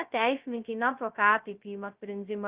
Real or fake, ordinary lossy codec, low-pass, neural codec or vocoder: fake; Opus, 32 kbps; 3.6 kHz; codec, 16 kHz, 0.2 kbps, FocalCodec